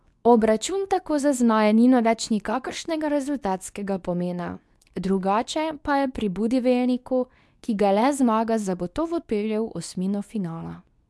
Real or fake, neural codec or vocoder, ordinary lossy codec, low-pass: fake; codec, 24 kHz, 0.9 kbps, WavTokenizer, medium speech release version 2; none; none